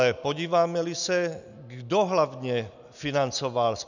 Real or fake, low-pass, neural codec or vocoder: real; 7.2 kHz; none